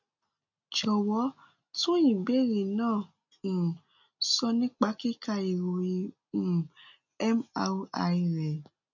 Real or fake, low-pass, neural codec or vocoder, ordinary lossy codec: real; 7.2 kHz; none; none